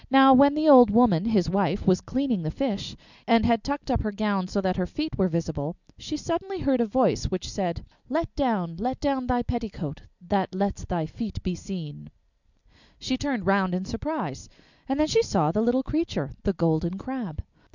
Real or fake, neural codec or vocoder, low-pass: real; none; 7.2 kHz